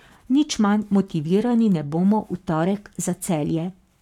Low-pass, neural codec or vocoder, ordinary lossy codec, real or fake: 19.8 kHz; codec, 44.1 kHz, 7.8 kbps, Pupu-Codec; none; fake